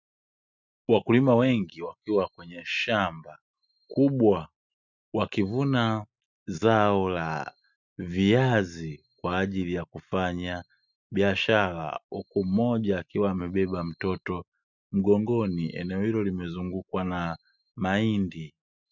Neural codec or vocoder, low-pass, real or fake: none; 7.2 kHz; real